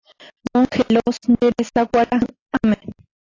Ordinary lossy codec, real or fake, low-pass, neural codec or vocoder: AAC, 32 kbps; real; 7.2 kHz; none